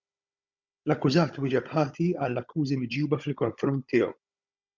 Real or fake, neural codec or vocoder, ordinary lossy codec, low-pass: fake; codec, 16 kHz, 16 kbps, FunCodec, trained on Chinese and English, 50 frames a second; Opus, 64 kbps; 7.2 kHz